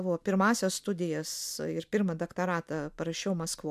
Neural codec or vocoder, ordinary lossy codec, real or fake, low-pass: none; MP3, 96 kbps; real; 14.4 kHz